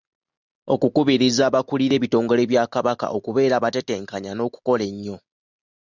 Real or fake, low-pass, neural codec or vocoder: real; 7.2 kHz; none